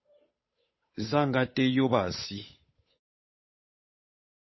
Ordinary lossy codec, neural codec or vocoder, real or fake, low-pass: MP3, 24 kbps; codec, 16 kHz, 8 kbps, FunCodec, trained on Chinese and English, 25 frames a second; fake; 7.2 kHz